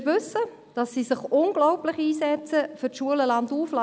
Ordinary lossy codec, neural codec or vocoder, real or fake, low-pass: none; none; real; none